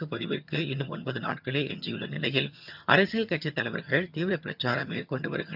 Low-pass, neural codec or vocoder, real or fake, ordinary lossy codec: 5.4 kHz; vocoder, 22.05 kHz, 80 mel bands, HiFi-GAN; fake; none